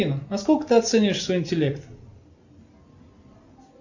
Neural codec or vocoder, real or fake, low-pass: none; real; 7.2 kHz